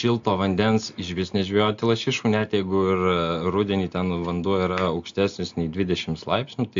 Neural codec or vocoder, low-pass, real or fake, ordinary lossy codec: none; 7.2 kHz; real; AAC, 64 kbps